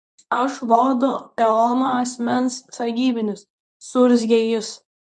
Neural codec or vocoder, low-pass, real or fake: codec, 24 kHz, 0.9 kbps, WavTokenizer, medium speech release version 2; 10.8 kHz; fake